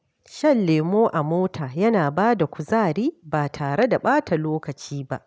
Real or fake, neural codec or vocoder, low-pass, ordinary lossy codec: real; none; none; none